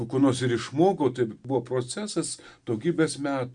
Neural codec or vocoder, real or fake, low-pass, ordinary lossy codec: none; real; 9.9 kHz; Opus, 64 kbps